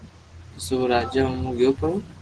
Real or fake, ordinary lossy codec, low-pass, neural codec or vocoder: real; Opus, 16 kbps; 10.8 kHz; none